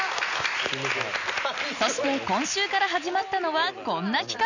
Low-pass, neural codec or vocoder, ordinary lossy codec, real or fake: 7.2 kHz; none; none; real